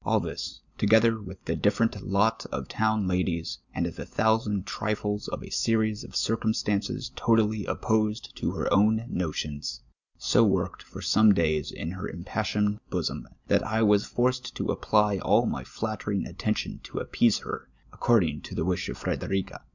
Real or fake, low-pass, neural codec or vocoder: real; 7.2 kHz; none